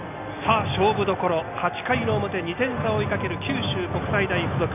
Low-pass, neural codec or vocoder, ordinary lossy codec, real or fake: 3.6 kHz; none; none; real